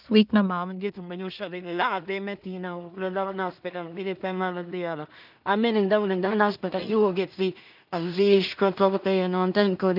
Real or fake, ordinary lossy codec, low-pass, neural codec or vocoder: fake; none; 5.4 kHz; codec, 16 kHz in and 24 kHz out, 0.4 kbps, LongCat-Audio-Codec, two codebook decoder